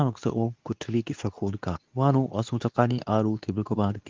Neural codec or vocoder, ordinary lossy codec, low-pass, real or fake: codec, 24 kHz, 0.9 kbps, WavTokenizer, medium speech release version 2; Opus, 32 kbps; 7.2 kHz; fake